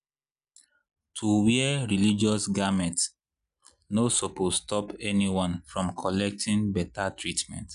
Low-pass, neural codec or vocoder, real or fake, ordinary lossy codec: 10.8 kHz; none; real; none